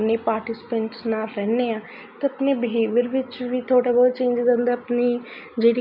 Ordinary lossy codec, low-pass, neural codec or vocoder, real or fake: none; 5.4 kHz; none; real